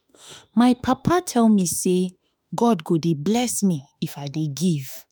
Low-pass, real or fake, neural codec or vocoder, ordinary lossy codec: none; fake; autoencoder, 48 kHz, 32 numbers a frame, DAC-VAE, trained on Japanese speech; none